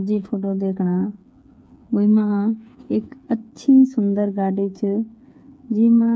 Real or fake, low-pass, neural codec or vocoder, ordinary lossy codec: fake; none; codec, 16 kHz, 8 kbps, FreqCodec, smaller model; none